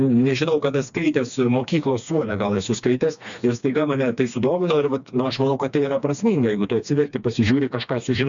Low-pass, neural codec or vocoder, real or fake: 7.2 kHz; codec, 16 kHz, 2 kbps, FreqCodec, smaller model; fake